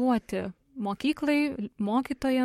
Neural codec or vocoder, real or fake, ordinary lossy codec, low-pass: codec, 44.1 kHz, 7.8 kbps, Pupu-Codec; fake; MP3, 64 kbps; 19.8 kHz